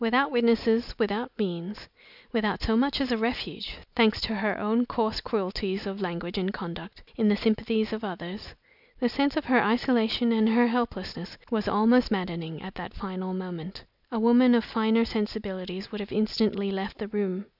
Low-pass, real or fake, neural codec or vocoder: 5.4 kHz; real; none